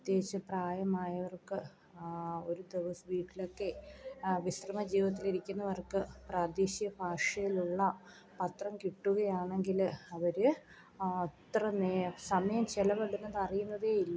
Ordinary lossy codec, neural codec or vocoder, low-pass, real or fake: none; none; none; real